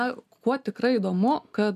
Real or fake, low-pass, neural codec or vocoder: real; 14.4 kHz; none